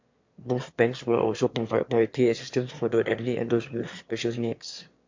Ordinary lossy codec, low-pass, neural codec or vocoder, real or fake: MP3, 48 kbps; 7.2 kHz; autoencoder, 22.05 kHz, a latent of 192 numbers a frame, VITS, trained on one speaker; fake